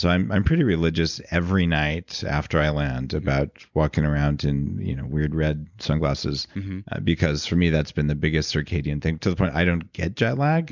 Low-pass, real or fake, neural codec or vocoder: 7.2 kHz; real; none